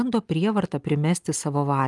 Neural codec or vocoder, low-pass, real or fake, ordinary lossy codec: none; 10.8 kHz; real; Opus, 32 kbps